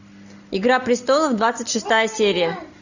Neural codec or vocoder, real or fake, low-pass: none; real; 7.2 kHz